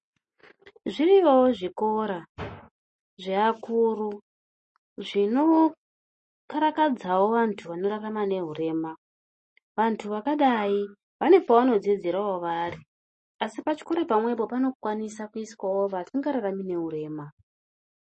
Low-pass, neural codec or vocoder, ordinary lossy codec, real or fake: 10.8 kHz; none; MP3, 32 kbps; real